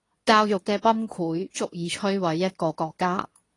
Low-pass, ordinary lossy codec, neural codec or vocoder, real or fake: 10.8 kHz; AAC, 32 kbps; codec, 24 kHz, 0.9 kbps, WavTokenizer, medium speech release version 2; fake